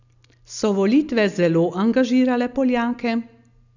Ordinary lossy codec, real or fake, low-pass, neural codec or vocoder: none; real; 7.2 kHz; none